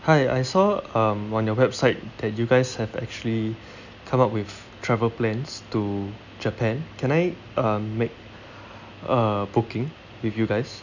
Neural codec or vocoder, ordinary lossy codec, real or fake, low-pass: none; none; real; 7.2 kHz